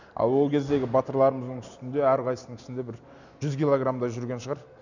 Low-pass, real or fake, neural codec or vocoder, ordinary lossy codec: 7.2 kHz; real; none; none